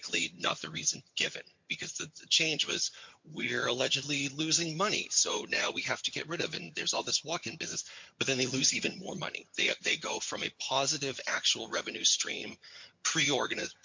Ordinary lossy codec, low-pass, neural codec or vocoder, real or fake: MP3, 48 kbps; 7.2 kHz; vocoder, 22.05 kHz, 80 mel bands, HiFi-GAN; fake